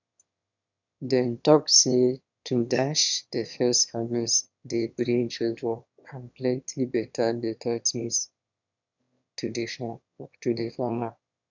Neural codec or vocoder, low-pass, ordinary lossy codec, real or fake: autoencoder, 22.05 kHz, a latent of 192 numbers a frame, VITS, trained on one speaker; 7.2 kHz; none; fake